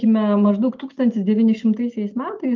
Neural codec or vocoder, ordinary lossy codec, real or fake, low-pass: none; Opus, 32 kbps; real; 7.2 kHz